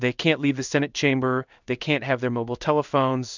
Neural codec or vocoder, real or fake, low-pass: codec, 16 kHz in and 24 kHz out, 1 kbps, XY-Tokenizer; fake; 7.2 kHz